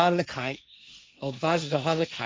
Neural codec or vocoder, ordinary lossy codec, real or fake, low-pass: codec, 16 kHz, 1.1 kbps, Voila-Tokenizer; none; fake; none